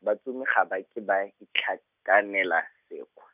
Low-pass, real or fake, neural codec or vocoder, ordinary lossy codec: 3.6 kHz; real; none; none